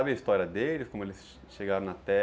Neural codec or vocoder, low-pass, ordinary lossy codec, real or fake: none; none; none; real